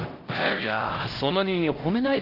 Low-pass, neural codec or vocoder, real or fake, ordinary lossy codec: 5.4 kHz; codec, 16 kHz, 1 kbps, X-Codec, HuBERT features, trained on LibriSpeech; fake; Opus, 16 kbps